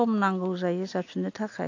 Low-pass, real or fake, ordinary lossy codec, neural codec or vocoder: 7.2 kHz; real; AAC, 48 kbps; none